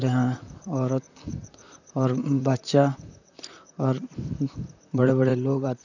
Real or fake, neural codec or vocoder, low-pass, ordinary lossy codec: fake; vocoder, 44.1 kHz, 128 mel bands, Pupu-Vocoder; 7.2 kHz; none